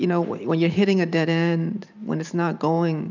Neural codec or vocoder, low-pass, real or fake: vocoder, 44.1 kHz, 128 mel bands every 512 samples, BigVGAN v2; 7.2 kHz; fake